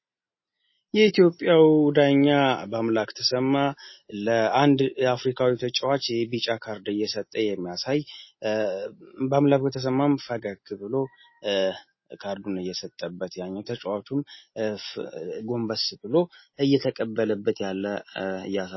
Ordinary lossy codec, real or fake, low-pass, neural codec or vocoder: MP3, 24 kbps; real; 7.2 kHz; none